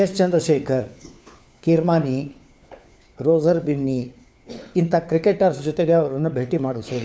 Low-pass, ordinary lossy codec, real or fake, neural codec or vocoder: none; none; fake; codec, 16 kHz, 4 kbps, FunCodec, trained on LibriTTS, 50 frames a second